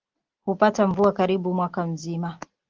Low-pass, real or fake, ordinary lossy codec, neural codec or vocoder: 7.2 kHz; real; Opus, 16 kbps; none